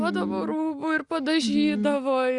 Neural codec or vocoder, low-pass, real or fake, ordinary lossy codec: none; 10.8 kHz; real; Opus, 64 kbps